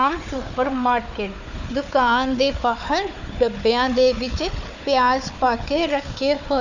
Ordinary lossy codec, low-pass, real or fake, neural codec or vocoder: none; 7.2 kHz; fake; codec, 16 kHz, 4 kbps, FunCodec, trained on Chinese and English, 50 frames a second